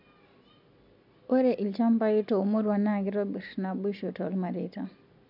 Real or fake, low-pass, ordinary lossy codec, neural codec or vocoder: real; 5.4 kHz; none; none